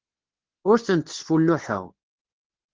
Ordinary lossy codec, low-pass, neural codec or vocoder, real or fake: Opus, 16 kbps; 7.2 kHz; codec, 24 kHz, 0.9 kbps, WavTokenizer, medium speech release version 2; fake